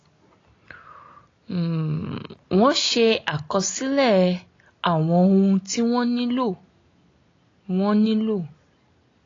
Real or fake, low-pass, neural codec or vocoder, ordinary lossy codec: real; 7.2 kHz; none; AAC, 32 kbps